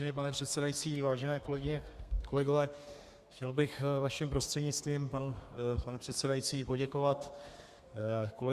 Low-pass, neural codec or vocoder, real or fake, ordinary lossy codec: 14.4 kHz; codec, 32 kHz, 1.9 kbps, SNAC; fake; Opus, 64 kbps